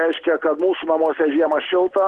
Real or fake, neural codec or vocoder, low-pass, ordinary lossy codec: real; none; 10.8 kHz; Opus, 16 kbps